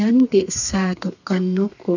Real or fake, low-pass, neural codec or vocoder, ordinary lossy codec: fake; 7.2 kHz; codec, 32 kHz, 1.9 kbps, SNAC; none